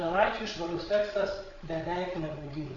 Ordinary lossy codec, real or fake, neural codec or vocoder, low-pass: AAC, 32 kbps; fake; codec, 16 kHz, 8 kbps, FreqCodec, larger model; 7.2 kHz